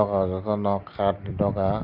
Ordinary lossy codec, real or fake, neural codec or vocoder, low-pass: Opus, 24 kbps; real; none; 5.4 kHz